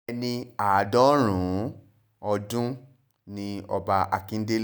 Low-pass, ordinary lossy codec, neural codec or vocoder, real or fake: none; none; none; real